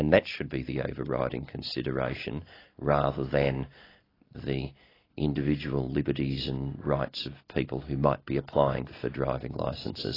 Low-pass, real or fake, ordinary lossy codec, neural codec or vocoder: 5.4 kHz; real; AAC, 24 kbps; none